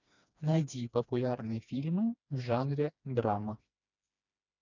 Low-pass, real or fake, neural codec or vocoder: 7.2 kHz; fake; codec, 16 kHz, 2 kbps, FreqCodec, smaller model